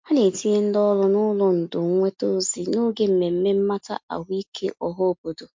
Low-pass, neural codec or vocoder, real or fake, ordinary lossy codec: 7.2 kHz; none; real; MP3, 48 kbps